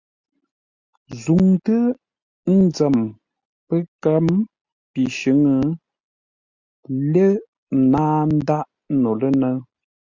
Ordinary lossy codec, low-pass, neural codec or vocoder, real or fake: Opus, 64 kbps; 7.2 kHz; none; real